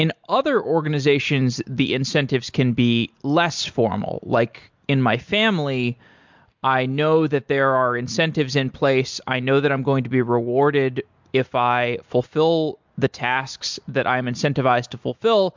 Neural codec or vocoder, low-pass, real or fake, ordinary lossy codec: none; 7.2 kHz; real; MP3, 64 kbps